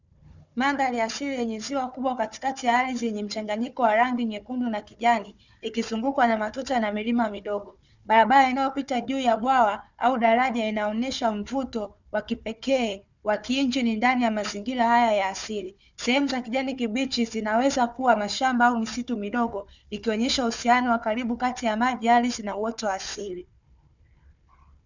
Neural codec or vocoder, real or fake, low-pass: codec, 16 kHz, 4 kbps, FunCodec, trained on Chinese and English, 50 frames a second; fake; 7.2 kHz